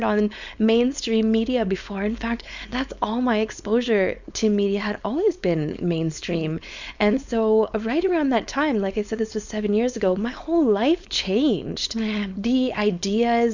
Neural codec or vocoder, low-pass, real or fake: codec, 16 kHz, 4.8 kbps, FACodec; 7.2 kHz; fake